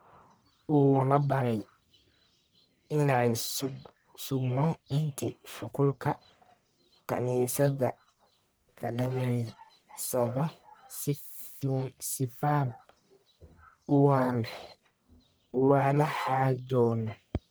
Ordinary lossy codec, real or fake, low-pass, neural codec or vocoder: none; fake; none; codec, 44.1 kHz, 1.7 kbps, Pupu-Codec